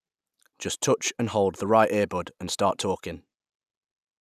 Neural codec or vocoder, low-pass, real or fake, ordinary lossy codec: none; 14.4 kHz; real; none